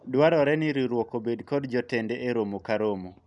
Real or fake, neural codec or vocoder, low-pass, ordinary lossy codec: real; none; 10.8 kHz; none